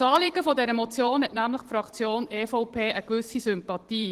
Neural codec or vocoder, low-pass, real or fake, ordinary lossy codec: vocoder, 44.1 kHz, 128 mel bands every 512 samples, BigVGAN v2; 14.4 kHz; fake; Opus, 16 kbps